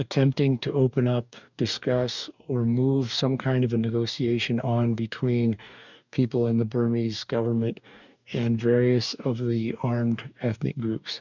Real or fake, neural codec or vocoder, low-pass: fake; codec, 44.1 kHz, 2.6 kbps, DAC; 7.2 kHz